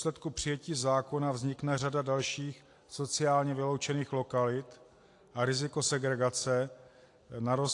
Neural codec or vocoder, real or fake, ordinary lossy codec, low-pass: none; real; AAC, 48 kbps; 10.8 kHz